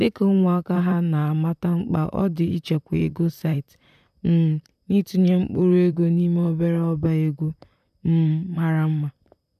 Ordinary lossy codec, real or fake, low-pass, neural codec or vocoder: none; fake; 14.4 kHz; vocoder, 44.1 kHz, 128 mel bands, Pupu-Vocoder